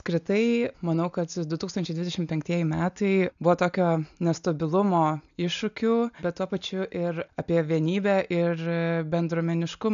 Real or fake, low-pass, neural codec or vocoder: real; 7.2 kHz; none